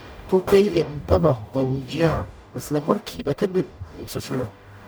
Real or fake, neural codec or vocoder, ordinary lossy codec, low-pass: fake; codec, 44.1 kHz, 0.9 kbps, DAC; none; none